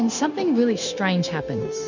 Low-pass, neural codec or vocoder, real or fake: 7.2 kHz; codec, 16 kHz, 0.9 kbps, LongCat-Audio-Codec; fake